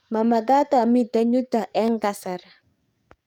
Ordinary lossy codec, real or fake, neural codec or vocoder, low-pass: none; fake; codec, 44.1 kHz, 7.8 kbps, DAC; 19.8 kHz